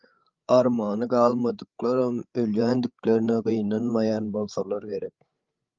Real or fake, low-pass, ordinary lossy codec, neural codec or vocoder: fake; 7.2 kHz; Opus, 32 kbps; codec, 16 kHz, 8 kbps, FreqCodec, larger model